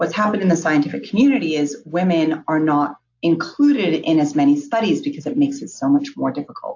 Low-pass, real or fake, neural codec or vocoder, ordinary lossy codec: 7.2 kHz; real; none; AAC, 48 kbps